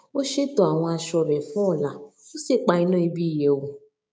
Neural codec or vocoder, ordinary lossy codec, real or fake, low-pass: codec, 16 kHz, 6 kbps, DAC; none; fake; none